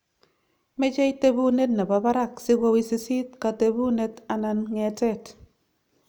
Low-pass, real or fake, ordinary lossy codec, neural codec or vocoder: none; real; none; none